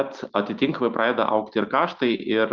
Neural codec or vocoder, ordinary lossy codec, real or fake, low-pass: none; Opus, 32 kbps; real; 7.2 kHz